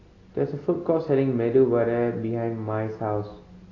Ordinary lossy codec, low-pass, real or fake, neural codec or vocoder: AAC, 32 kbps; 7.2 kHz; real; none